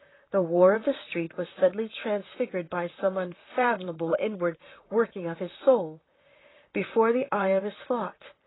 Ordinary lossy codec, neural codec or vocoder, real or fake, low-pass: AAC, 16 kbps; vocoder, 44.1 kHz, 128 mel bands, Pupu-Vocoder; fake; 7.2 kHz